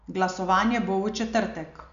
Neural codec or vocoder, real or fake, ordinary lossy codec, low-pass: none; real; none; 7.2 kHz